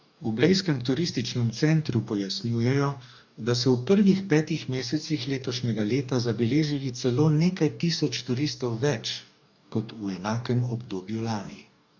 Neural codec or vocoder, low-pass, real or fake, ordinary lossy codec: codec, 44.1 kHz, 2.6 kbps, DAC; 7.2 kHz; fake; none